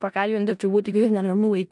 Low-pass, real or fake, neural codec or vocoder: 10.8 kHz; fake; codec, 16 kHz in and 24 kHz out, 0.4 kbps, LongCat-Audio-Codec, four codebook decoder